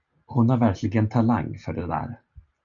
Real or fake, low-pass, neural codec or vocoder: real; 7.2 kHz; none